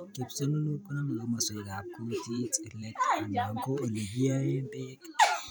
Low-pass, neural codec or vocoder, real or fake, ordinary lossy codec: none; vocoder, 44.1 kHz, 128 mel bands every 512 samples, BigVGAN v2; fake; none